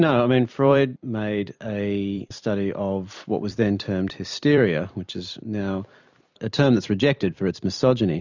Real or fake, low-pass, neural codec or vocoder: fake; 7.2 kHz; vocoder, 44.1 kHz, 128 mel bands every 256 samples, BigVGAN v2